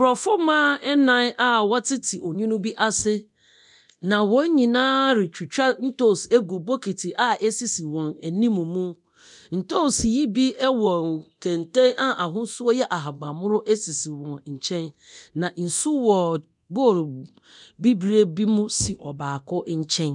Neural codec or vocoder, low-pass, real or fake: codec, 24 kHz, 0.9 kbps, DualCodec; 10.8 kHz; fake